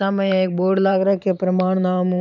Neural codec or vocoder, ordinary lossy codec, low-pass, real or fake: vocoder, 44.1 kHz, 128 mel bands every 512 samples, BigVGAN v2; none; 7.2 kHz; fake